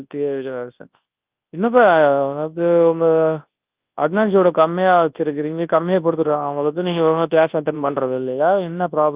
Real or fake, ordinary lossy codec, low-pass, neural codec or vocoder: fake; Opus, 32 kbps; 3.6 kHz; codec, 24 kHz, 0.9 kbps, WavTokenizer, large speech release